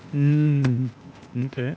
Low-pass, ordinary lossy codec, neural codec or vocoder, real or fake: none; none; codec, 16 kHz, 0.8 kbps, ZipCodec; fake